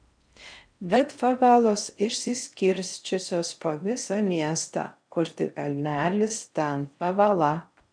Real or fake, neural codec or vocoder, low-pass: fake; codec, 16 kHz in and 24 kHz out, 0.6 kbps, FocalCodec, streaming, 4096 codes; 9.9 kHz